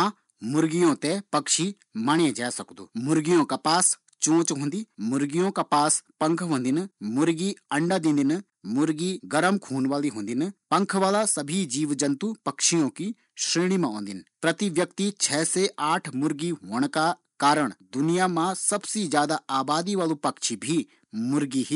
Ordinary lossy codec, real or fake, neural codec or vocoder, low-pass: none; real; none; 10.8 kHz